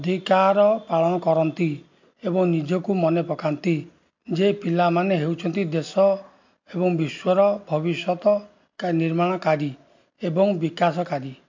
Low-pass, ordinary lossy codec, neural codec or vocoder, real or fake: 7.2 kHz; MP3, 48 kbps; none; real